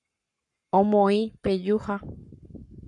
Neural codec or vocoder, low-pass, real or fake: codec, 44.1 kHz, 7.8 kbps, Pupu-Codec; 10.8 kHz; fake